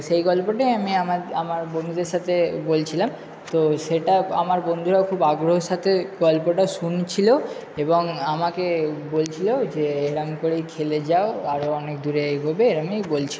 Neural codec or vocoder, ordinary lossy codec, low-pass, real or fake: none; none; none; real